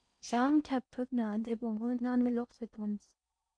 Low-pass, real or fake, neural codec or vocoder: 9.9 kHz; fake; codec, 16 kHz in and 24 kHz out, 0.6 kbps, FocalCodec, streaming, 4096 codes